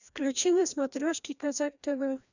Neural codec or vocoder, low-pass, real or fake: codec, 16 kHz, 1 kbps, FreqCodec, larger model; 7.2 kHz; fake